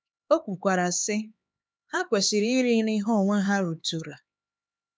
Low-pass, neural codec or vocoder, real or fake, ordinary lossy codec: none; codec, 16 kHz, 4 kbps, X-Codec, HuBERT features, trained on LibriSpeech; fake; none